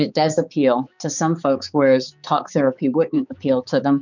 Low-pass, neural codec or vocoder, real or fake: 7.2 kHz; codec, 16 kHz, 4 kbps, X-Codec, HuBERT features, trained on balanced general audio; fake